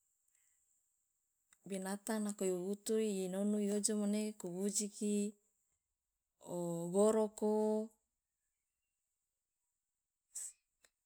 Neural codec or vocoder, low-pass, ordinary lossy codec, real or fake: none; none; none; real